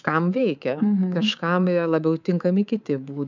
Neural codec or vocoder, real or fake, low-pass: codec, 24 kHz, 3.1 kbps, DualCodec; fake; 7.2 kHz